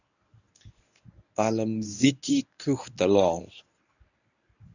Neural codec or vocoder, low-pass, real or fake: codec, 24 kHz, 0.9 kbps, WavTokenizer, medium speech release version 1; 7.2 kHz; fake